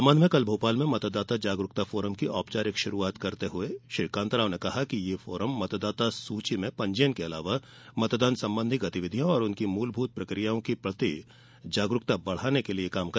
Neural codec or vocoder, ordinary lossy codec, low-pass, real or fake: none; none; none; real